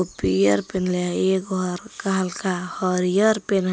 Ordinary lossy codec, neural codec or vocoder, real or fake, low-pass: none; none; real; none